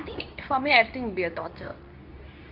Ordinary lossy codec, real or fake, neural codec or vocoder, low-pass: none; fake; codec, 24 kHz, 0.9 kbps, WavTokenizer, medium speech release version 2; 5.4 kHz